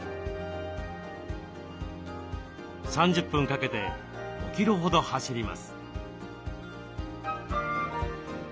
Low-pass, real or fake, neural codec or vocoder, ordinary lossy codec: none; real; none; none